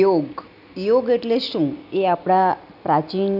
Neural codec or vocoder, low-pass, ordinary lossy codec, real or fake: none; 5.4 kHz; none; real